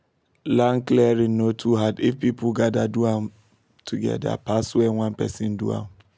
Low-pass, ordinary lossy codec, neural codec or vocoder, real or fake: none; none; none; real